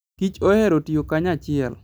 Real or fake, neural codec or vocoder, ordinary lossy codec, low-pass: real; none; none; none